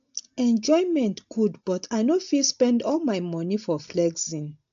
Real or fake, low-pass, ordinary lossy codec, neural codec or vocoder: real; 7.2 kHz; AAC, 64 kbps; none